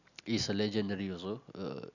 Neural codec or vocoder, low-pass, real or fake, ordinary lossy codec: none; 7.2 kHz; real; none